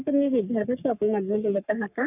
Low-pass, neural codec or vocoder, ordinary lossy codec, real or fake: 3.6 kHz; codec, 44.1 kHz, 3.4 kbps, Pupu-Codec; none; fake